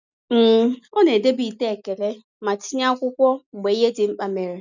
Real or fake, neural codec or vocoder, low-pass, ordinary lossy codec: real; none; 7.2 kHz; none